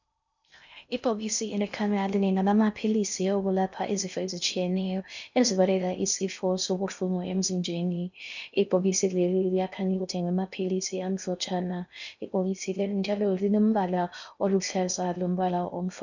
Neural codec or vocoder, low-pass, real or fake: codec, 16 kHz in and 24 kHz out, 0.6 kbps, FocalCodec, streaming, 2048 codes; 7.2 kHz; fake